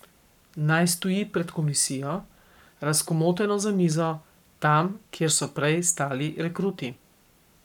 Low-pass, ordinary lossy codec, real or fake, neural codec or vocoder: 19.8 kHz; none; fake; codec, 44.1 kHz, 7.8 kbps, Pupu-Codec